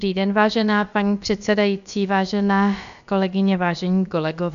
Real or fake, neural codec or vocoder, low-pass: fake; codec, 16 kHz, about 1 kbps, DyCAST, with the encoder's durations; 7.2 kHz